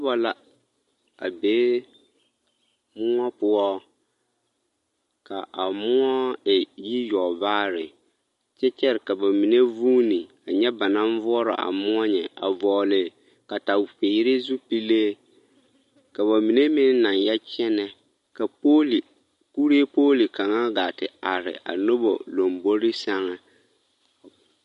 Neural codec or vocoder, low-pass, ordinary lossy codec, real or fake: none; 14.4 kHz; MP3, 48 kbps; real